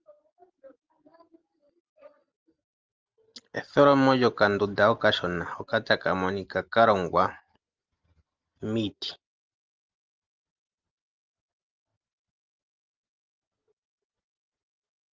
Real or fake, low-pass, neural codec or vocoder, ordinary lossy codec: real; 7.2 kHz; none; Opus, 24 kbps